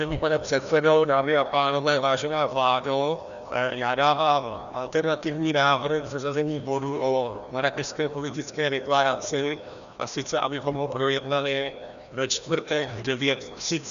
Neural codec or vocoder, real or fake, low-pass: codec, 16 kHz, 1 kbps, FreqCodec, larger model; fake; 7.2 kHz